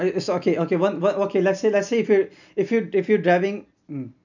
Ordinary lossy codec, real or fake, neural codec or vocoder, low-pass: none; real; none; 7.2 kHz